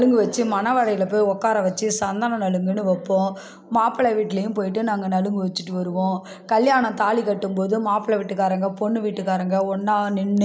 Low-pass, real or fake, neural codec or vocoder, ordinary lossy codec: none; real; none; none